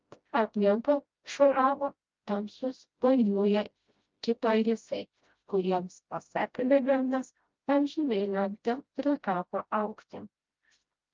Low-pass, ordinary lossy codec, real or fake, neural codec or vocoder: 7.2 kHz; Opus, 24 kbps; fake; codec, 16 kHz, 0.5 kbps, FreqCodec, smaller model